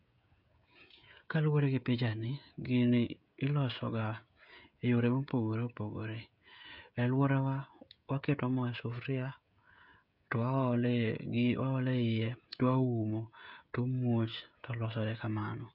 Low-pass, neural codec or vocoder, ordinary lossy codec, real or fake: 5.4 kHz; codec, 16 kHz, 16 kbps, FreqCodec, smaller model; none; fake